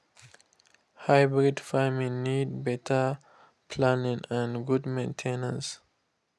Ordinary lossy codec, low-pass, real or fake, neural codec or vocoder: none; none; real; none